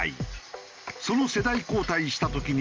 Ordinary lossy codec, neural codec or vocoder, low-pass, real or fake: Opus, 32 kbps; none; 7.2 kHz; real